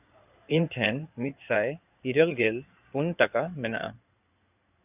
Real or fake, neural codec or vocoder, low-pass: fake; codec, 16 kHz in and 24 kHz out, 2.2 kbps, FireRedTTS-2 codec; 3.6 kHz